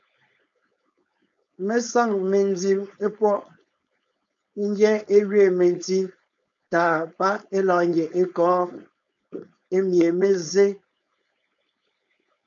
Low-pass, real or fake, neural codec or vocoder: 7.2 kHz; fake; codec, 16 kHz, 4.8 kbps, FACodec